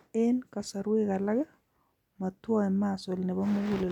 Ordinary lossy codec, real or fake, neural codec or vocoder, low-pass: none; real; none; 19.8 kHz